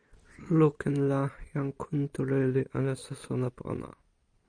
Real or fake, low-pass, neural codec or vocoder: real; 9.9 kHz; none